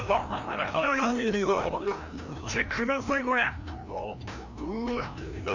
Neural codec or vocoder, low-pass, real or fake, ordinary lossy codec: codec, 16 kHz, 1 kbps, FreqCodec, larger model; 7.2 kHz; fake; none